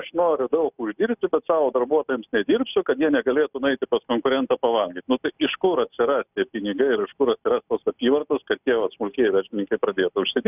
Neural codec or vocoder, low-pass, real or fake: none; 3.6 kHz; real